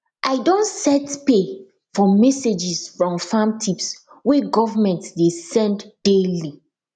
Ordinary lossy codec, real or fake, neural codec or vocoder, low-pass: none; real; none; 9.9 kHz